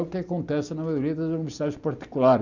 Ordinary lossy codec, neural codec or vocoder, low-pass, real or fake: none; none; 7.2 kHz; real